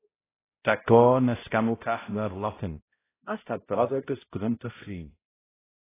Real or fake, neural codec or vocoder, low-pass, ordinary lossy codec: fake; codec, 16 kHz, 0.5 kbps, X-Codec, HuBERT features, trained on balanced general audio; 3.6 kHz; AAC, 16 kbps